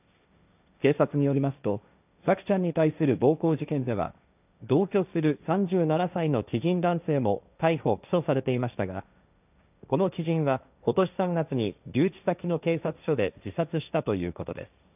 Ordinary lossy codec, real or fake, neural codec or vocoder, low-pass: none; fake; codec, 16 kHz, 1.1 kbps, Voila-Tokenizer; 3.6 kHz